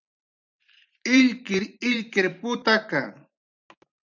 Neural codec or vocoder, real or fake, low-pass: vocoder, 44.1 kHz, 128 mel bands every 512 samples, BigVGAN v2; fake; 7.2 kHz